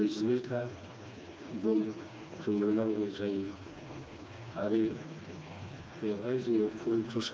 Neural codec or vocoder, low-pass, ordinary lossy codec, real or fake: codec, 16 kHz, 2 kbps, FreqCodec, smaller model; none; none; fake